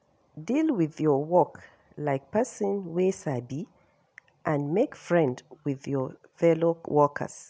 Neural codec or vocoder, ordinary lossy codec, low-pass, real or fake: none; none; none; real